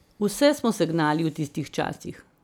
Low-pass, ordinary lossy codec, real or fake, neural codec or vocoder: none; none; real; none